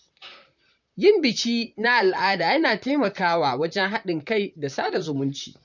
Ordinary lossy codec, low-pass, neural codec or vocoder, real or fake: none; 7.2 kHz; vocoder, 44.1 kHz, 128 mel bands, Pupu-Vocoder; fake